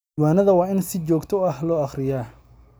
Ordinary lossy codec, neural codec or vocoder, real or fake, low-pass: none; none; real; none